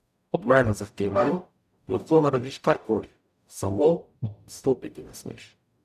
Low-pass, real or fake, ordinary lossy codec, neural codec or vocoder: 14.4 kHz; fake; none; codec, 44.1 kHz, 0.9 kbps, DAC